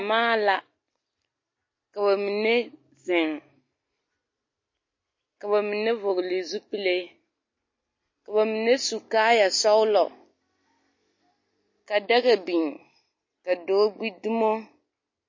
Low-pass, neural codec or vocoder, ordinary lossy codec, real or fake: 7.2 kHz; none; MP3, 32 kbps; real